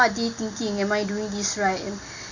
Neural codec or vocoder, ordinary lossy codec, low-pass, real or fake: none; none; 7.2 kHz; real